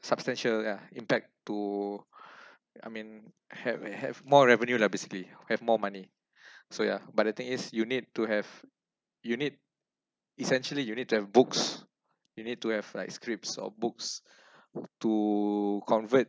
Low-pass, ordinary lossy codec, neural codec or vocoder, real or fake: none; none; none; real